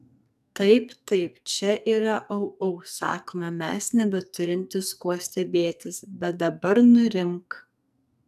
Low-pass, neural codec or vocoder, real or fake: 14.4 kHz; codec, 44.1 kHz, 2.6 kbps, SNAC; fake